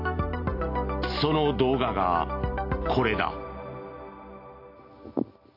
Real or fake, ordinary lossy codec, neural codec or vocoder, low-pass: real; AAC, 32 kbps; none; 5.4 kHz